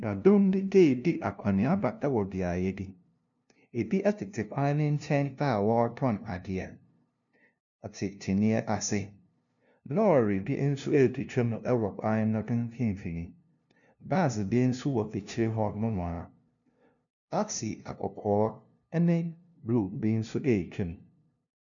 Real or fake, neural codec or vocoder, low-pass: fake; codec, 16 kHz, 0.5 kbps, FunCodec, trained on LibriTTS, 25 frames a second; 7.2 kHz